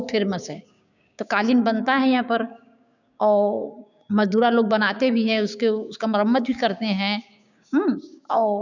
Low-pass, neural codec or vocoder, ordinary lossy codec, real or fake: 7.2 kHz; codec, 16 kHz, 6 kbps, DAC; none; fake